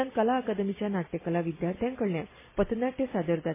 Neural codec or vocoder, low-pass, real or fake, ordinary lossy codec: none; 3.6 kHz; real; MP3, 16 kbps